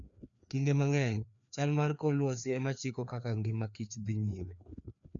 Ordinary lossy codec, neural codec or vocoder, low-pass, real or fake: none; codec, 16 kHz, 2 kbps, FreqCodec, larger model; 7.2 kHz; fake